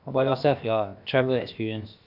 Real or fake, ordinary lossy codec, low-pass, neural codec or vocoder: fake; none; 5.4 kHz; codec, 16 kHz, about 1 kbps, DyCAST, with the encoder's durations